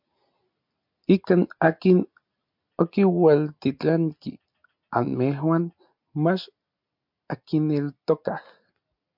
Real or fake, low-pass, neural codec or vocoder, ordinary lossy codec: real; 5.4 kHz; none; MP3, 48 kbps